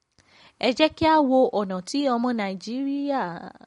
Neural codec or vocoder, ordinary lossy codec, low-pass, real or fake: none; MP3, 48 kbps; 10.8 kHz; real